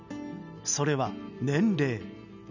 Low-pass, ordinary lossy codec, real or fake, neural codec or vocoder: 7.2 kHz; none; real; none